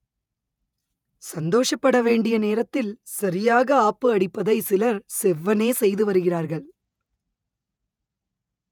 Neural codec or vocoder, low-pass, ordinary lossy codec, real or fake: vocoder, 48 kHz, 128 mel bands, Vocos; 19.8 kHz; none; fake